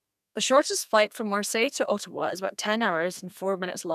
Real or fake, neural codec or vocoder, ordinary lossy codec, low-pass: fake; codec, 32 kHz, 1.9 kbps, SNAC; none; 14.4 kHz